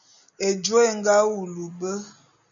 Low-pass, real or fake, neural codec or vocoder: 7.2 kHz; real; none